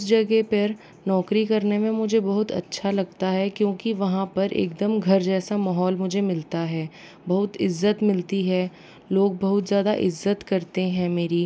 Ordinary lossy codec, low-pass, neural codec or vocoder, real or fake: none; none; none; real